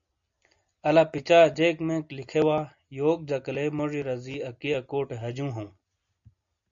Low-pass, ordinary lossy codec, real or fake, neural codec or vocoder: 7.2 kHz; AAC, 64 kbps; real; none